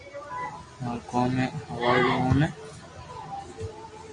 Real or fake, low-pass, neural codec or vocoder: real; 9.9 kHz; none